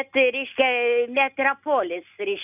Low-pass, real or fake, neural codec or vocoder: 3.6 kHz; real; none